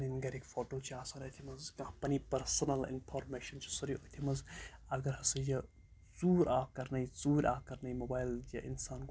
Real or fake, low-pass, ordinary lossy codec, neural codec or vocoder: real; none; none; none